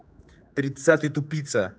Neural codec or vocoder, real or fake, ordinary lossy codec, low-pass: codec, 16 kHz, 4 kbps, X-Codec, HuBERT features, trained on general audio; fake; none; none